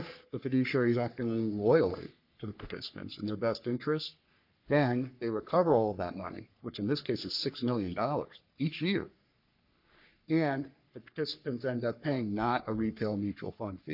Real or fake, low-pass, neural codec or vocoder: fake; 5.4 kHz; codec, 44.1 kHz, 3.4 kbps, Pupu-Codec